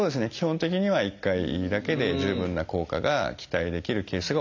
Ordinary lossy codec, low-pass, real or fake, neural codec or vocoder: MP3, 64 kbps; 7.2 kHz; real; none